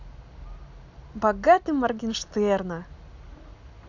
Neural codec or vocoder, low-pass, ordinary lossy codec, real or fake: none; 7.2 kHz; none; real